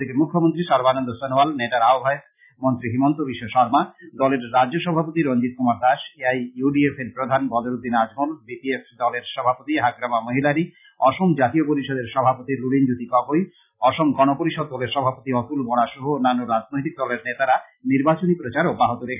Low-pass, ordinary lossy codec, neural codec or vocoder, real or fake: 3.6 kHz; none; none; real